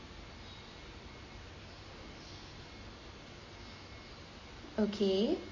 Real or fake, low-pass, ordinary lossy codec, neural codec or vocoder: real; 7.2 kHz; MP3, 32 kbps; none